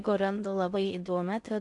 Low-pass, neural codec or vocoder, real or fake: 10.8 kHz; codec, 16 kHz in and 24 kHz out, 0.6 kbps, FocalCodec, streaming, 2048 codes; fake